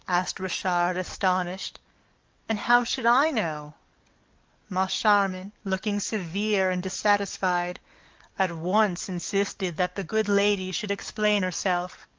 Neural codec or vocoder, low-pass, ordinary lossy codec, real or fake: codec, 44.1 kHz, 7.8 kbps, DAC; 7.2 kHz; Opus, 24 kbps; fake